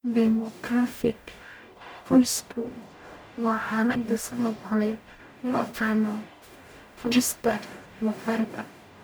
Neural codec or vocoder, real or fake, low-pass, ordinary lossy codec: codec, 44.1 kHz, 0.9 kbps, DAC; fake; none; none